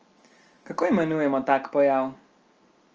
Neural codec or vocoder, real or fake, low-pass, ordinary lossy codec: none; real; 7.2 kHz; Opus, 24 kbps